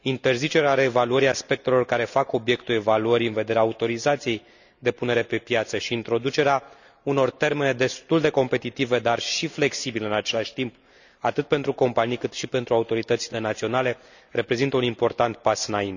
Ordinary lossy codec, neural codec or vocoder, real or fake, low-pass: none; none; real; 7.2 kHz